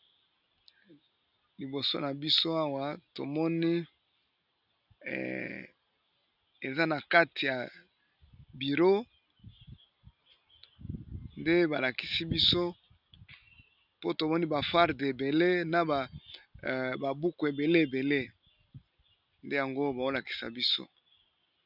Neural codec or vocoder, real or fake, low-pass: none; real; 5.4 kHz